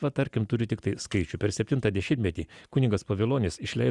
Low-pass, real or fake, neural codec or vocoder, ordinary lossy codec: 10.8 kHz; real; none; Opus, 64 kbps